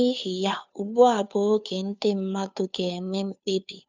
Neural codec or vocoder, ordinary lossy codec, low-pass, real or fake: codec, 16 kHz, 2 kbps, FunCodec, trained on Chinese and English, 25 frames a second; none; 7.2 kHz; fake